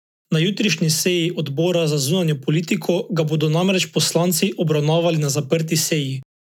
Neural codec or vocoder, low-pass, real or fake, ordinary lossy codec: none; 19.8 kHz; real; none